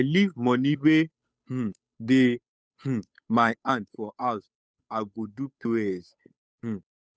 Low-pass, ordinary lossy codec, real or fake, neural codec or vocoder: none; none; fake; codec, 16 kHz, 8 kbps, FunCodec, trained on Chinese and English, 25 frames a second